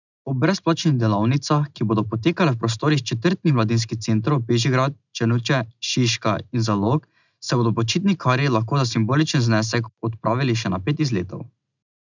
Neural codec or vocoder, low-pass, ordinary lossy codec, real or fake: none; 7.2 kHz; none; real